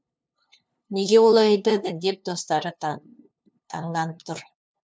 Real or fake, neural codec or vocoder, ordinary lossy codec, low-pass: fake; codec, 16 kHz, 2 kbps, FunCodec, trained on LibriTTS, 25 frames a second; none; none